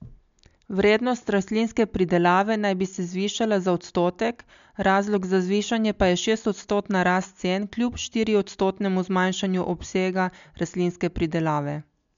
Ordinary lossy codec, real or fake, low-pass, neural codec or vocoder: MP3, 64 kbps; real; 7.2 kHz; none